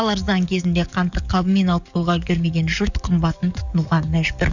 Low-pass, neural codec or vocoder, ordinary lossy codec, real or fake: 7.2 kHz; codec, 44.1 kHz, 7.8 kbps, DAC; none; fake